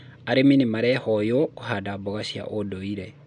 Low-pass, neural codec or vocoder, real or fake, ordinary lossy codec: 9.9 kHz; none; real; none